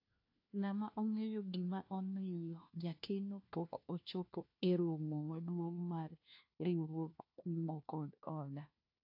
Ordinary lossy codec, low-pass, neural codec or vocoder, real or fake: AAC, 48 kbps; 5.4 kHz; codec, 16 kHz, 1 kbps, FunCodec, trained on LibriTTS, 50 frames a second; fake